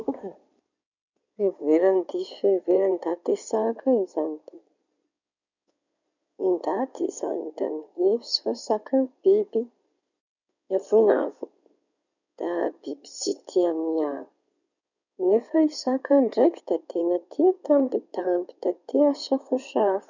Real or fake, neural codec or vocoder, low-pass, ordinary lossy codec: fake; codec, 16 kHz in and 24 kHz out, 2.2 kbps, FireRedTTS-2 codec; 7.2 kHz; none